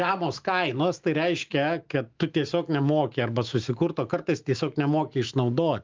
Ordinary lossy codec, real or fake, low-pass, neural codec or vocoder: Opus, 32 kbps; fake; 7.2 kHz; autoencoder, 48 kHz, 128 numbers a frame, DAC-VAE, trained on Japanese speech